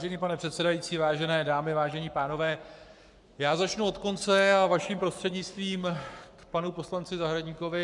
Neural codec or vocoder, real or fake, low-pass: codec, 44.1 kHz, 7.8 kbps, Pupu-Codec; fake; 10.8 kHz